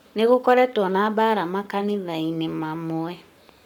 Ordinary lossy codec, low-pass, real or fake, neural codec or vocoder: none; 19.8 kHz; fake; codec, 44.1 kHz, 7.8 kbps, Pupu-Codec